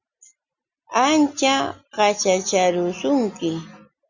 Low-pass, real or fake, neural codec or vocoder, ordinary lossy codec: 7.2 kHz; real; none; Opus, 64 kbps